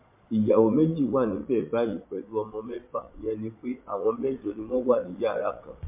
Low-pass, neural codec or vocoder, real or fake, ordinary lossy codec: 3.6 kHz; vocoder, 22.05 kHz, 80 mel bands, Vocos; fake; MP3, 24 kbps